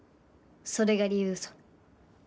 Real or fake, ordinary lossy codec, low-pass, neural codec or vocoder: real; none; none; none